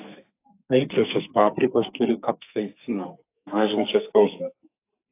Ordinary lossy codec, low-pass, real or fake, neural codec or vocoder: AAC, 24 kbps; 3.6 kHz; fake; codec, 44.1 kHz, 2.6 kbps, SNAC